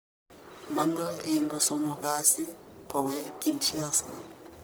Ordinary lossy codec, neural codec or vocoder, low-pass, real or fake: none; codec, 44.1 kHz, 1.7 kbps, Pupu-Codec; none; fake